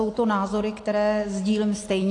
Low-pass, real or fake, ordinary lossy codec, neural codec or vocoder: 10.8 kHz; real; AAC, 48 kbps; none